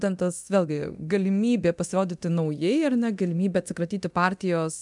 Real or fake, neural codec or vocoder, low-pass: fake; codec, 24 kHz, 0.9 kbps, DualCodec; 10.8 kHz